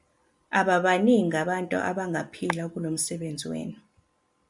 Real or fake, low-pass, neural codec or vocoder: real; 10.8 kHz; none